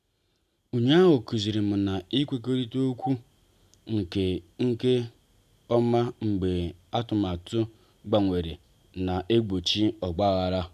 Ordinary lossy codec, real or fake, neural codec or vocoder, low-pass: none; real; none; 14.4 kHz